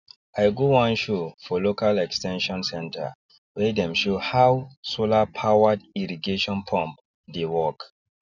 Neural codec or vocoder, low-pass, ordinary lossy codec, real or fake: none; 7.2 kHz; none; real